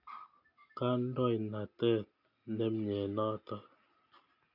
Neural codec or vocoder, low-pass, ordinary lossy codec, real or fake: none; 5.4 kHz; none; real